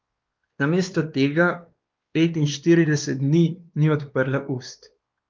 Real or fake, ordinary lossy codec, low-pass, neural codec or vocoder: fake; Opus, 32 kbps; 7.2 kHz; codec, 16 kHz, 2 kbps, X-Codec, WavLM features, trained on Multilingual LibriSpeech